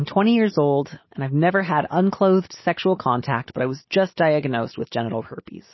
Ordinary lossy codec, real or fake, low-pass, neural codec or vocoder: MP3, 24 kbps; real; 7.2 kHz; none